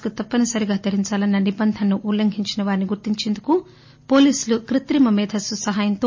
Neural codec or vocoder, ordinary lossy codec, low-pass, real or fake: none; MP3, 32 kbps; 7.2 kHz; real